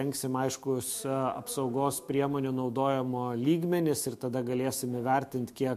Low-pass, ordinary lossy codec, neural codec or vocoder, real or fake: 14.4 kHz; MP3, 64 kbps; autoencoder, 48 kHz, 128 numbers a frame, DAC-VAE, trained on Japanese speech; fake